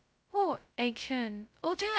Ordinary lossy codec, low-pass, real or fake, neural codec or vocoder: none; none; fake; codec, 16 kHz, 0.2 kbps, FocalCodec